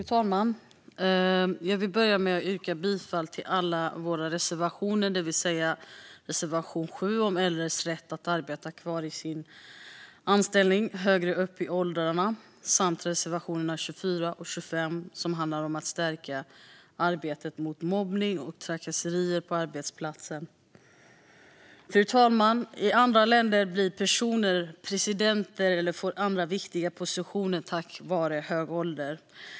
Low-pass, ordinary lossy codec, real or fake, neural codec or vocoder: none; none; real; none